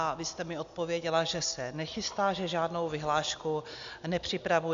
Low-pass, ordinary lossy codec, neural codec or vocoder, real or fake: 7.2 kHz; AAC, 64 kbps; none; real